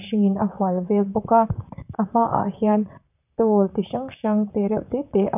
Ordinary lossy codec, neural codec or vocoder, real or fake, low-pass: none; vocoder, 44.1 kHz, 128 mel bands, Pupu-Vocoder; fake; 3.6 kHz